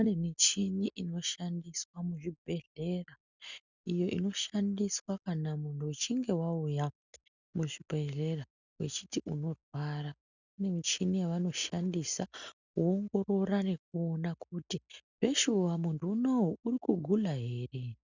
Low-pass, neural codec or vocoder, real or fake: 7.2 kHz; none; real